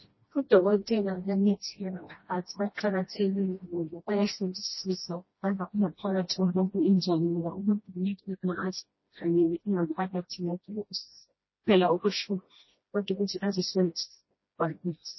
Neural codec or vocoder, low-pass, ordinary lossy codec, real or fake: codec, 16 kHz, 1 kbps, FreqCodec, smaller model; 7.2 kHz; MP3, 24 kbps; fake